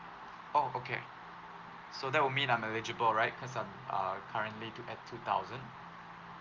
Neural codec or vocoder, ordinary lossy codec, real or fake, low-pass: none; Opus, 32 kbps; real; 7.2 kHz